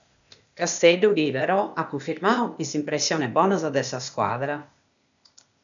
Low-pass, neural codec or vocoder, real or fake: 7.2 kHz; codec, 16 kHz, 0.8 kbps, ZipCodec; fake